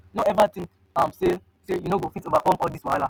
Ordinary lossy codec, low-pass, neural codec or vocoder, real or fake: none; none; none; real